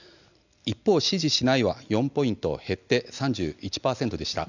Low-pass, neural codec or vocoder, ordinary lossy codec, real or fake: 7.2 kHz; none; none; real